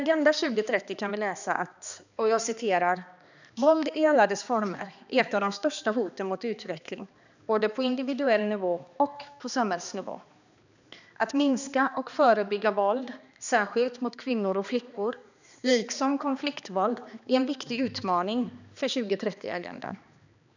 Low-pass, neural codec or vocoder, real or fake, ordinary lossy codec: 7.2 kHz; codec, 16 kHz, 2 kbps, X-Codec, HuBERT features, trained on balanced general audio; fake; none